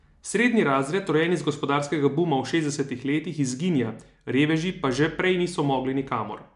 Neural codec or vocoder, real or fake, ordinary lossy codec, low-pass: none; real; MP3, 96 kbps; 10.8 kHz